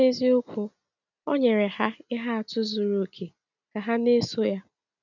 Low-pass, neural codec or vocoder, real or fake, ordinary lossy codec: 7.2 kHz; none; real; none